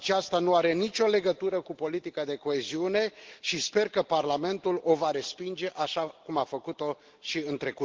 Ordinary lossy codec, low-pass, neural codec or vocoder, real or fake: Opus, 16 kbps; 7.2 kHz; none; real